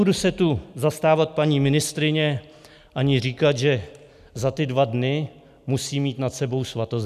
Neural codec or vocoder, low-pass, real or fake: none; 14.4 kHz; real